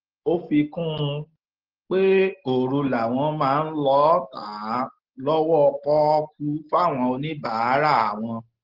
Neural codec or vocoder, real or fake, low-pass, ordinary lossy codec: none; real; 5.4 kHz; Opus, 16 kbps